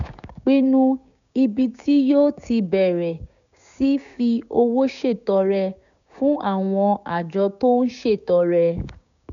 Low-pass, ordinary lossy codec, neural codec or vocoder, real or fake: 7.2 kHz; MP3, 96 kbps; codec, 16 kHz, 6 kbps, DAC; fake